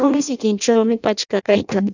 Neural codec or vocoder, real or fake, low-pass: codec, 16 kHz in and 24 kHz out, 0.6 kbps, FireRedTTS-2 codec; fake; 7.2 kHz